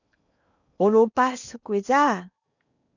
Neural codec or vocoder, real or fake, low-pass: codec, 16 kHz in and 24 kHz out, 0.8 kbps, FocalCodec, streaming, 65536 codes; fake; 7.2 kHz